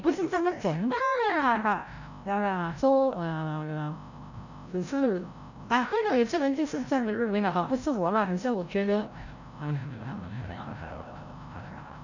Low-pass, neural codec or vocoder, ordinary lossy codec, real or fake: 7.2 kHz; codec, 16 kHz, 0.5 kbps, FreqCodec, larger model; none; fake